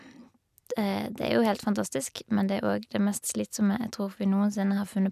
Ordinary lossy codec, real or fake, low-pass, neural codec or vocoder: none; real; 14.4 kHz; none